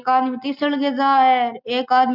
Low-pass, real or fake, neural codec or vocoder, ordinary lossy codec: 5.4 kHz; real; none; none